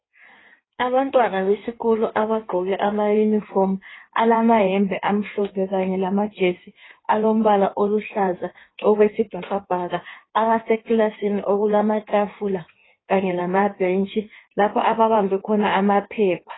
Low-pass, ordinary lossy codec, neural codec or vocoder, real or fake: 7.2 kHz; AAC, 16 kbps; codec, 16 kHz in and 24 kHz out, 1.1 kbps, FireRedTTS-2 codec; fake